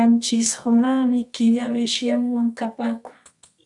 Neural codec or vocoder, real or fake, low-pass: codec, 24 kHz, 0.9 kbps, WavTokenizer, medium music audio release; fake; 10.8 kHz